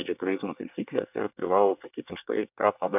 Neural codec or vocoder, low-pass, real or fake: codec, 24 kHz, 1 kbps, SNAC; 3.6 kHz; fake